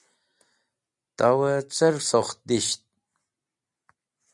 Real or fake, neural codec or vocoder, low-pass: real; none; 10.8 kHz